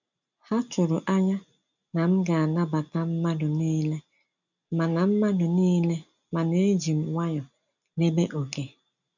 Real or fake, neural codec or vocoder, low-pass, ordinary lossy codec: real; none; 7.2 kHz; none